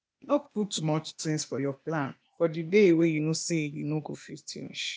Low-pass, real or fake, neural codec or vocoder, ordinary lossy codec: none; fake; codec, 16 kHz, 0.8 kbps, ZipCodec; none